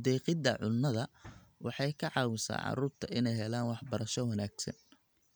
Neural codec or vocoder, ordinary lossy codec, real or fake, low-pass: none; none; real; none